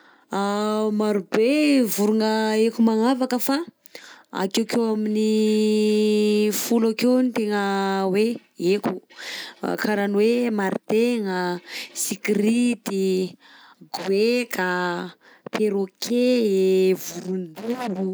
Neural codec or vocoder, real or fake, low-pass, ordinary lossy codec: none; real; none; none